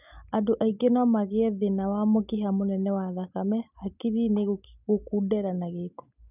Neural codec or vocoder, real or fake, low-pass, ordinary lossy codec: none; real; 3.6 kHz; none